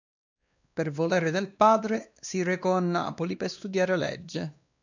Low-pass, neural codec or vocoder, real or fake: 7.2 kHz; codec, 16 kHz, 2 kbps, X-Codec, WavLM features, trained on Multilingual LibriSpeech; fake